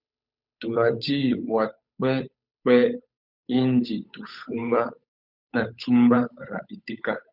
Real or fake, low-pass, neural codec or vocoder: fake; 5.4 kHz; codec, 16 kHz, 8 kbps, FunCodec, trained on Chinese and English, 25 frames a second